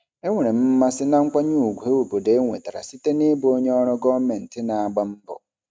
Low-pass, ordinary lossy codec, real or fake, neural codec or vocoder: none; none; real; none